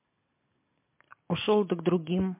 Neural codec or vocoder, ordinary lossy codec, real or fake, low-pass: none; MP3, 24 kbps; real; 3.6 kHz